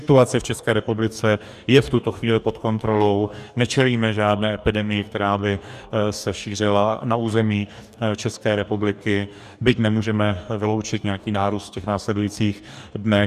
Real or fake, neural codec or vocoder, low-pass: fake; codec, 44.1 kHz, 2.6 kbps, DAC; 14.4 kHz